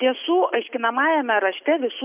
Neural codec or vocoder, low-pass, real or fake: none; 3.6 kHz; real